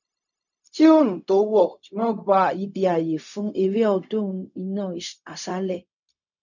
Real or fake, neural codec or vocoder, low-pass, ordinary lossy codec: fake; codec, 16 kHz, 0.4 kbps, LongCat-Audio-Codec; 7.2 kHz; none